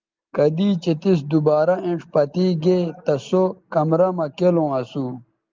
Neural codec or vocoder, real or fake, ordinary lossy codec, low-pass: none; real; Opus, 32 kbps; 7.2 kHz